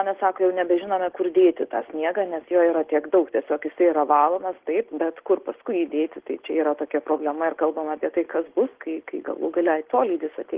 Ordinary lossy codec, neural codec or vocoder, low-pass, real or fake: Opus, 16 kbps; none; 3.6 kHz; real